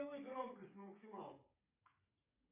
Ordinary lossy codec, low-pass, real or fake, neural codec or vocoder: AAC, 32 kbps; 3.6 kHz; fake; codec, 16 kHz, 16 kbps, FreqCodec, smaller model